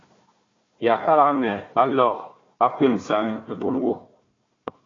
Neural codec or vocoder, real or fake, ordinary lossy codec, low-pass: codec, 16 kHz, 1 kbps, FunCodec, trained on Chinese and English, 50 frames a second; fake; AAC, 32 kbps; 7.2 kHz